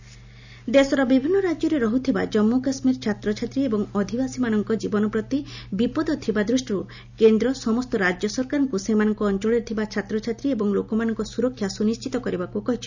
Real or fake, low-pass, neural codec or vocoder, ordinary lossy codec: real; 7.2 kHz; none; none